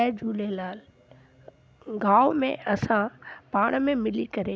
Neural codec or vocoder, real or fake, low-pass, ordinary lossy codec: none; real; none; none